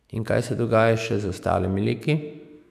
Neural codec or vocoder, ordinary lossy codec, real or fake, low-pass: autoencoder, 48 kHz, 128 numbers a frame, DAC-VAE, trained on Japanese speech; none; fake; 14.4 kHz